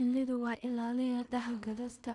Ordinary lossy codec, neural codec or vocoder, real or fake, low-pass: none; codec, 16 kHz in and 24 kHz out, 0.4 kbps, LongCat-Audio-Codec, two codebook decoder; fake; 10.8 kHz